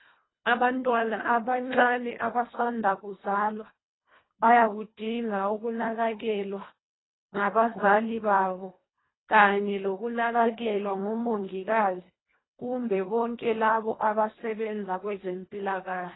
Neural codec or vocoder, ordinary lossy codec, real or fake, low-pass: codec, 24 kHz, 1.5 kbps, HILCodec; AAC, 16 kbps; fake; 7.2 kHz